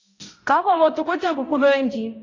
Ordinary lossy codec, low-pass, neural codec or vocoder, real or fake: AAC, 32 kbps; 7.2 kHz; codec, 16 kHz, 0.5 kbps, X-Codec, HuBERT features, trained on balanced general audio; fake